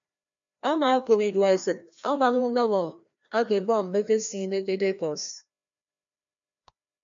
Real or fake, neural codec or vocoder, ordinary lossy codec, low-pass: fake; codec, 16 kHz, 1 kbps, FreqCodec, larger model; MP3, 64 kbps; 7.2 kHz